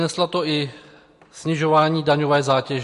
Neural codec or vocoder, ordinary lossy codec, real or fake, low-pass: none; MP3, 48 kbps; real; 14.4 kHz